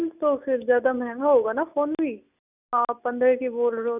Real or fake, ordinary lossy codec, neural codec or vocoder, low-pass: real; none; none; 3.6 kHz